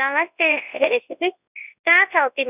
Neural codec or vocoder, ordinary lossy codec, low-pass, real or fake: codec, 24 kHz, 0.9 kbps, WavTokenizer, large speech release; none; 3.6 kHz; fake